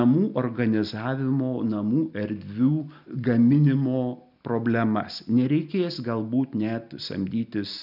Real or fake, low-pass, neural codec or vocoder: real; 5.4 kHz; none